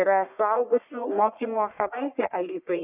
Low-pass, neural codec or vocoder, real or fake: 3.6 kHz; codec, 44.1 kHz, 1.7 kbps, Pupu-Codec; fake